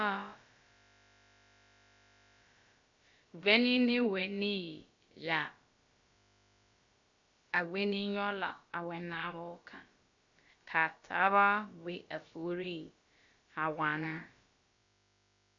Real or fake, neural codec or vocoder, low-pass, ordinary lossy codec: fake; codec, 16 kHz, about 1 kbps, DyCAST, with the encoder's durations; 7.2 kHz; MP3, 64 kbps